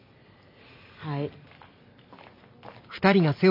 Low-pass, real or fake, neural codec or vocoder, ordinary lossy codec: 5.4 kHz; real; none; none